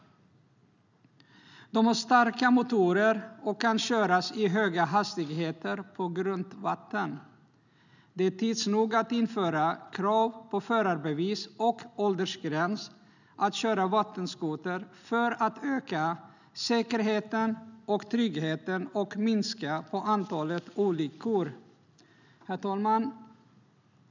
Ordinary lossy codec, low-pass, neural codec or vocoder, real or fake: none; 7.2 kHz; none; real